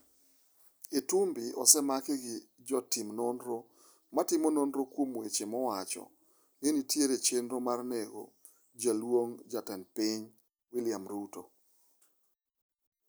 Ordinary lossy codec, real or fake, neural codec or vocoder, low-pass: none; real; none; none